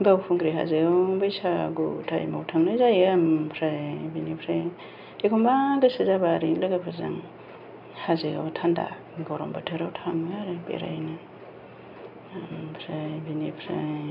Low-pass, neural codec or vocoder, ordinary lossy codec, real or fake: 5.4 kHz; none; none; real